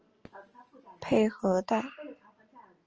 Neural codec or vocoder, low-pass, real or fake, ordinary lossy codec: none; 7.2 kHz; real; Opus, 24 kbps